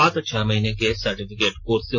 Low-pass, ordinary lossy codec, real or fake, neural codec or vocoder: none; none; real; none